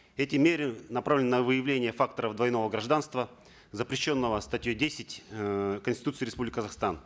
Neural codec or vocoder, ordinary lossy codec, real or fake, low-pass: none; none; real; none